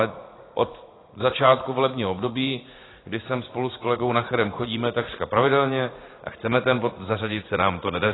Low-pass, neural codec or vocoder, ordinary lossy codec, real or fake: 7.2 kHz; vocoder, 44.1 kHz, 80 mel bands, Vocos; AAC, 16 kbps; fake